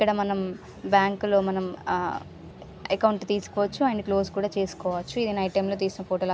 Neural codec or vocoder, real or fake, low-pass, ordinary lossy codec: none; real; none; none